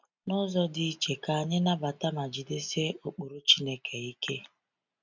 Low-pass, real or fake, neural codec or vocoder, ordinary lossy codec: 7.2 kHz; real; none; none